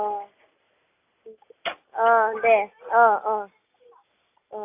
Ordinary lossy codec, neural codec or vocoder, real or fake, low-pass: AAC, 24 kbps; none; real; 3.6 kHz